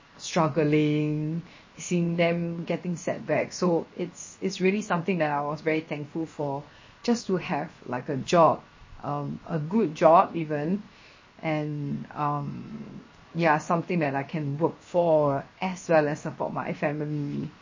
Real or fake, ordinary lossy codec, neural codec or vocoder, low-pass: fake; MP3, 32 kbps; codec, 16 kHz, 0.7 kbps, FocalCodec; 7.2 kHz